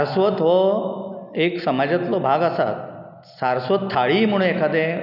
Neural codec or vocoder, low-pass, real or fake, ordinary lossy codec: none; 5.4 kHz; real; none